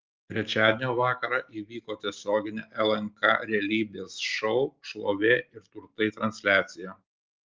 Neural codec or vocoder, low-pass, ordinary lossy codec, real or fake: none; 7.2 kHz; Opus, 24 kbps; real